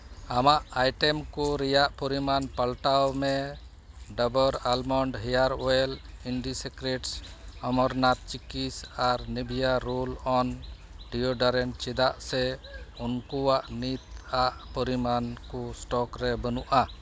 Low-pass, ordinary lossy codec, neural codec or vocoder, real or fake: none; none; none; real